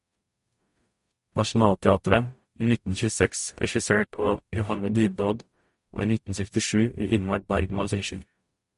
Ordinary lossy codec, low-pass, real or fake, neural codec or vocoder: MP3, 48 kbps; 14.4 kHz; fake; codec, 44.1 kHz, 0.9 kbps, DAC